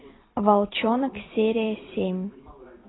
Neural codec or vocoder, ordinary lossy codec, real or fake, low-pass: vocoder, 44.1 kHz, 128 mel bands every 256 samples, BigVGAN v2; AAC, 16 kbps; fake; 7.2 kHz